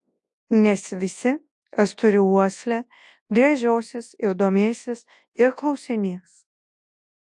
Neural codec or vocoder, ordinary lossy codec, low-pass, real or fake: codec, 24 kHz, 0.9 kbps, WavTokenizer, large speech release; AAC, 64 kbps; 10.8 kHz; fake